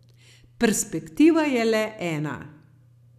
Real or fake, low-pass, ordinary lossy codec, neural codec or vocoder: real; 14.4 kHz; none; none